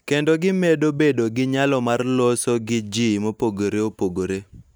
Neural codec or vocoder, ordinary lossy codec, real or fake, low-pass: none; none; real; none